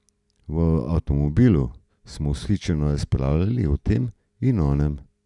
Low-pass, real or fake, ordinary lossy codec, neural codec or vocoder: 10.8 kHz; real; none; none